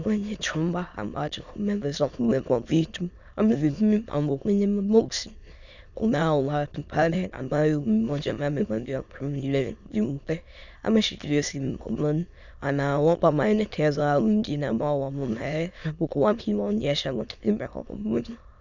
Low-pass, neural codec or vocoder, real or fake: 7.2 kHz; autoencoder, 22.05 kHz, a latent of 192 numbers a frame, VITS, trained on many speakers; fake